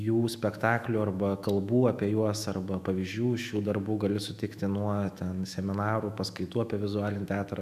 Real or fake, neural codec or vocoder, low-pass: fake; autoencoder, 48 kHz, 128 numbers a frame, DAC-VAE, trained on Japanese speech; 14.4 kHz